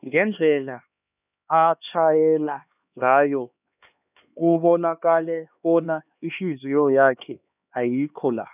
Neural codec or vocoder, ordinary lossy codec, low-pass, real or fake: codec, 16 kHz, 2 kbps, X-Codec, HuBERT features, trained on LibriSpeech; none; 3.6 kHz; fake